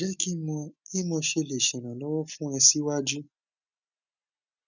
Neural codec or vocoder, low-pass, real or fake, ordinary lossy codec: none; 7.2 kHz; real; none